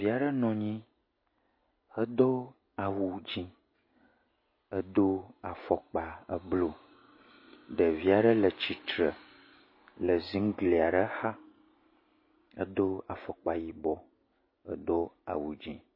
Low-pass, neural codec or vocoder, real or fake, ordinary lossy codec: 5.4 kHz; none; real; MP3, 24 kbps